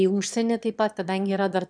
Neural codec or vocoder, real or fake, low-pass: autoencoder, 22.05 kHz, a latent of 192 numbers a frame, VITS, trained on one speaker; fake; 9.9 kHz